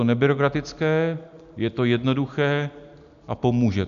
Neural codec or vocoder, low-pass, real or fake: none; 7.2 kHz; real